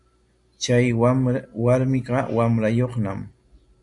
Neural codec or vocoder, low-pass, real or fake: none; 10.8 kHz; real